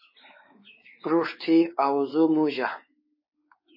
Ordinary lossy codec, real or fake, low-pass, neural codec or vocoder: MP3, 24 kbps; fake; 5.4 kHz; codec, 16 kHz, 4 kbps, X-Codec, WavLM features, trained on Multilingual LibriSpeech